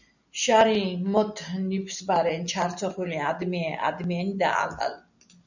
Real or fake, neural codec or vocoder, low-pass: real; none; 7.2 kHz